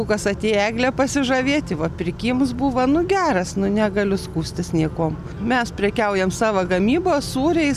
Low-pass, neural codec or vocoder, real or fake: 14.4 kHz; none; real